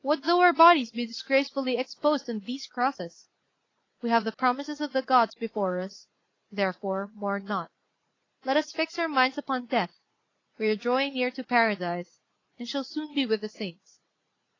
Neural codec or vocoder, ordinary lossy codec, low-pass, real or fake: vocoder, 44.1 kHz, 80 mel bands, Vocos; AAC, 32 kbps; 7.2 kHz; fake